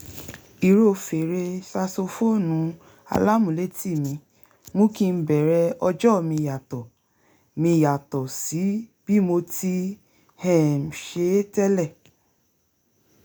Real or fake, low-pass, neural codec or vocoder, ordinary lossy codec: real; none; none; none